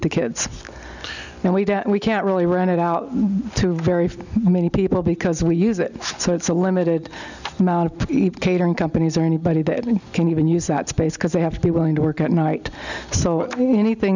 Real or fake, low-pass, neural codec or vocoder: real; 7.2 kHz; none